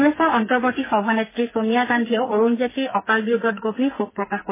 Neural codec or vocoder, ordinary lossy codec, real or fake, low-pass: codec, 44.1 kHz, 2.6 kbps, SNAC; MP3, 16 kbps; fake; 3.6 kHz